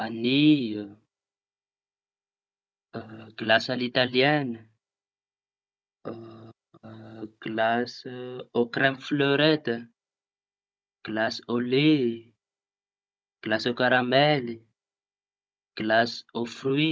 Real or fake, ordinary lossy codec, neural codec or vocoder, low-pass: fake; none; codec, 16 kHz, 16 kbps, FunCodec, trained on Chinese and English, 50 frames a second; none